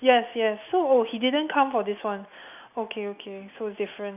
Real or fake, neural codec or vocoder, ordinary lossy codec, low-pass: real; none; none; 3.6 kHz